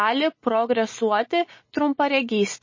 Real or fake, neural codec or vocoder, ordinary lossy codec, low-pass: real; none; MP3, 32 kbps; 7.2 kHz